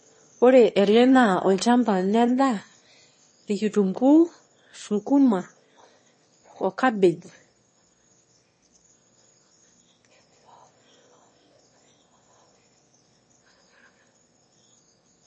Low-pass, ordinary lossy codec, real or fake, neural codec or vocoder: 9.9 kHz; MP3, 32 kbps; fake; autoencoder, 22.05 kHz, a latent of 192 numbers a frame, VITS, trained on one speaker